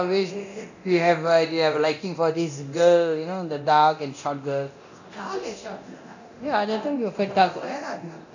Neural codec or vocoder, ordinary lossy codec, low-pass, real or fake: codec, 24 kHz, 0.9 kbps, DualCodec; none; 7.2 kHz; fake